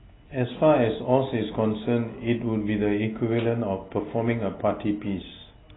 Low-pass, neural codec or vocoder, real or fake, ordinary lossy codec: 7.2 kHz; none; real; AAC, 16 kbps